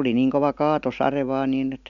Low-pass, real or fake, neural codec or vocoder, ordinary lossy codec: 7.2 kHz; real; none; none